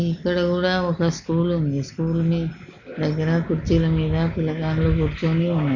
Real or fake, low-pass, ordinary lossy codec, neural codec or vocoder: real; 7.2 kHz; none; none